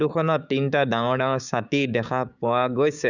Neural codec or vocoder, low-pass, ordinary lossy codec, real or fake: codec, 16 kHz, 6 kbps, DAC; 7.2 kHz; none; fake